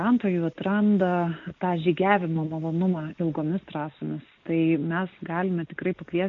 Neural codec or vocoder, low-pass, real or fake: none; 7.2 kHz; real